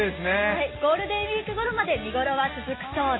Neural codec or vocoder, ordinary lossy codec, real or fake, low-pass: none; AAC, 16 kbps; real; 7.2 kHz